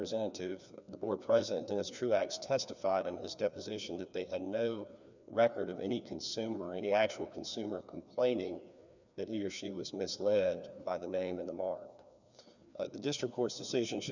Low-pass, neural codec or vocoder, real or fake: 7.2 kHz; codec, 16 kHz, 2 kbps, FreqCodec, larger model; fake